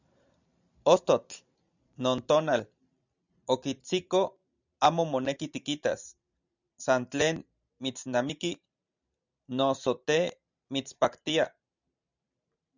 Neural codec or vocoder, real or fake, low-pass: vocoder, 44.1 kHz, 128 mel bands every 512 samples, BigVGAN v2; fake; 7.2 kHz